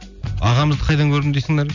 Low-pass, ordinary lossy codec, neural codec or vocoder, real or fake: 7.2 kHz; none; none; real